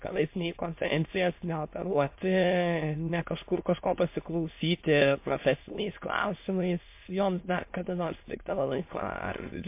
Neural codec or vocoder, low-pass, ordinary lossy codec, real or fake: autoencoder, 22.05 kHz, a latent of 192 numbers a frame, VITS, trained on many speakers; 3.6 kHz; MP3, 24 kbps; fake